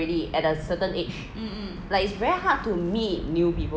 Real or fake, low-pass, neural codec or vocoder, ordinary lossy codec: real; none; none; none